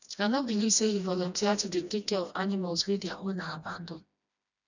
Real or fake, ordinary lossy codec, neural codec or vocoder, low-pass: fake; none; codec, 16 kHz, 1 kbps, FreqCodec, smaller model; 7.2 kHz